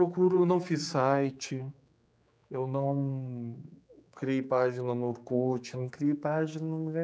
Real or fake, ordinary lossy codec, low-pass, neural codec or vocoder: fake; none; none; codec, 16 kHz, 4 kbps, X-Codec, HuBERT features, trained on general audio